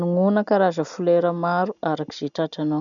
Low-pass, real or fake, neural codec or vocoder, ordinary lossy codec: 7.2 kHz; real; none; none